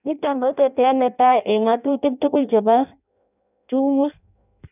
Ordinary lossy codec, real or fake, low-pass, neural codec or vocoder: none; fake; 3.6 kHz; codec, 16 kHz in and 24 kHz out, 0.6 kbps, FireRedTTS-2 codec